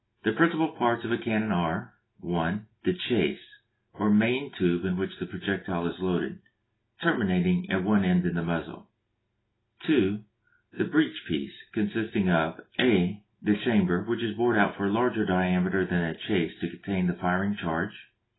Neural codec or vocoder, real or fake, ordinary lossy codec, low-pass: none; real; AAC, 16 kbps; 7.2 kHz